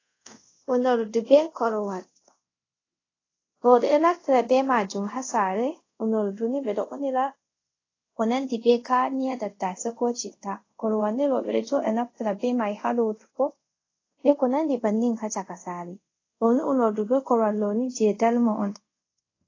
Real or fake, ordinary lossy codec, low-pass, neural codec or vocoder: fake; AAC, 32 kbps; 7.2 kHz; codec, 24 kHz, 0.5 kbps, DualCodec